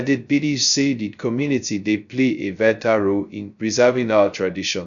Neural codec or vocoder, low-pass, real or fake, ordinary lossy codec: codec, 16 kHz, 0.2 kbps, FocalCodec; 7.2 kHz; fake; none